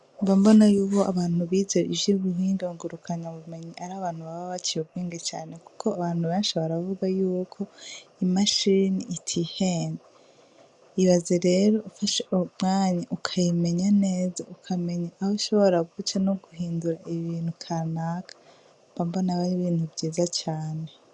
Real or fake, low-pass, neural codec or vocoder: real; 10.8 kHz; none